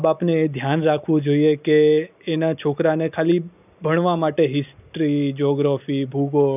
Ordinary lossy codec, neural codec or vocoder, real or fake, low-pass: none; none; real; 3.6 kHz